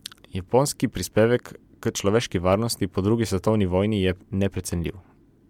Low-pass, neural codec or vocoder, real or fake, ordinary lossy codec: 19.8 kHz; vocoder, 44.1 kHz, 128 mel bands every 256 samples, BigVGAN v2; fake; MP3, 96 kbps